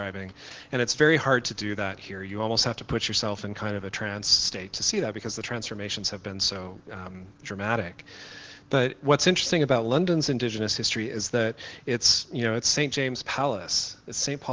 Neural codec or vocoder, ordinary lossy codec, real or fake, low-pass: none; Opus, 16 kbps; real; 7.2 kHz